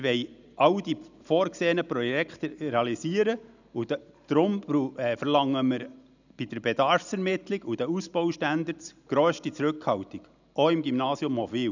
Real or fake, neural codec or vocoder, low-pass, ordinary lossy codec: real; none; 7.2 kHz; none